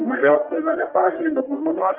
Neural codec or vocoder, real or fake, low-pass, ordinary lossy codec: codec, 44.1 kHz, 1.7 kbps, Pupu-Codec; fake; 3.6 kHz; Opus, 24 kbps